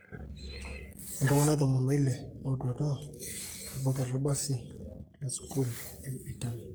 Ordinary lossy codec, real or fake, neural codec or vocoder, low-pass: none; fake; codec, 44.1 kHz, 3.4 kbps, Pupu-Codec; none